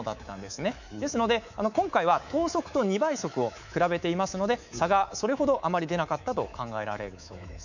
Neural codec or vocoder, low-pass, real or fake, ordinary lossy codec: codec, 24 kHz, 3.1 kbps, DualCodec; 7.2 kHz; fake; none